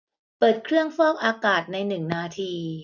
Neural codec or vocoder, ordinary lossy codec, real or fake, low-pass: none; none; real; 7.2 kHz